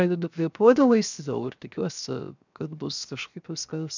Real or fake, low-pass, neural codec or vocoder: fake; 7.2 kHz; codec, 16 kHz, 0.7 kbps, FocalCodec